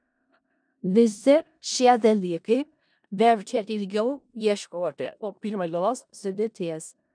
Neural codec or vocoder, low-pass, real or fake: codec, 16 kHz in and 24 kHz out, 0.4 kbps, LongCat-Audio-Codec, four codebook decoder; 9.9 kHz; fake